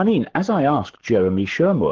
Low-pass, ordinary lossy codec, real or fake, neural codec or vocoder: 7.2 kHz; Opus, 16 kbps; fake; codec, 44.1 kHz, 7.8 kbps, Pupu-Codec